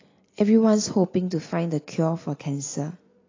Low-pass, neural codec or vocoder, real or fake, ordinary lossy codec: 7.2 kHz; none; real; AAC, 32 kbps